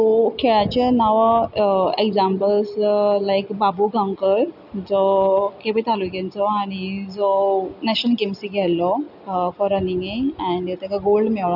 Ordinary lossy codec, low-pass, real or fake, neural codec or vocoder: none; 5.4 kHz; real; none